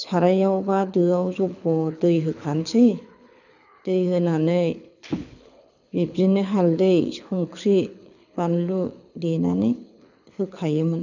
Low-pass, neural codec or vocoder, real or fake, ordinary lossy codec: 7.2 kHz; codec, 24 kHz, 6 kbps, HILCodec; fake; none